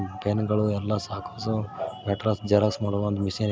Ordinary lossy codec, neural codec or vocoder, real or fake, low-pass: none; none; real; none